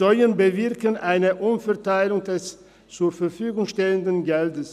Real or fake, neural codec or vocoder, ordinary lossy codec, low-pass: real; none; none; 14.4 kHz